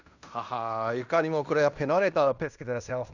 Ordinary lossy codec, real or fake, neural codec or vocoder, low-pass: none; fake; codec, 16 kHz in and 24 kHz out, 0.9 kbps, LongCat-Audio-Codec, fine tuned four codebook decoder; 7.2 kHz